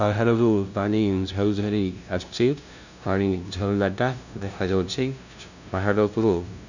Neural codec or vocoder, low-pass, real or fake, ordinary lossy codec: codec, 16 kHz, 0.5 kbps, FunCodec, trained on LibriTTS, 25 frames a second; 7.2 kHz; fake; none